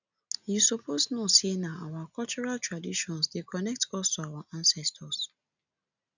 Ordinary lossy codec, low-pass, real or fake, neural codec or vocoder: none; 7.2 kHz; real; none